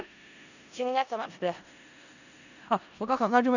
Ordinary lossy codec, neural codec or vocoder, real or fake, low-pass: none; codec, 16 kHz in and 24 kHz out, 0.4 kbps, LongCat-Audio-Codec, four codebook decoder; fake; 7.2 kHz